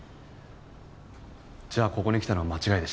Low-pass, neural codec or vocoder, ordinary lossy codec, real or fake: none; none; none; real